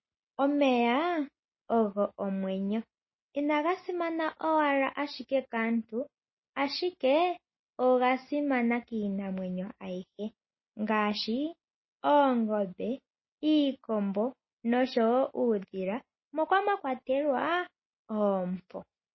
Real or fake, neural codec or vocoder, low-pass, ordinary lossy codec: real; none; 7.2 kHz; MP3, 24 kbps